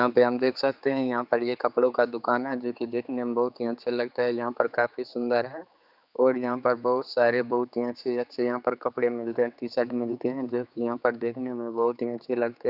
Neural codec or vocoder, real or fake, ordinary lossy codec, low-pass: codec, 16 kHz, 4 kbps, X-Codec, HuBERT features, trained on general audio; fake; none; 5.4 kHz